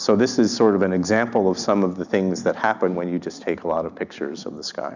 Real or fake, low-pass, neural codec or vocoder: real; 7.2 kHz; none